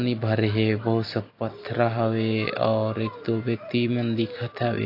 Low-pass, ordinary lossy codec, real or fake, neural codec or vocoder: 5.4 kHz; AAC, 32 kbps; real; none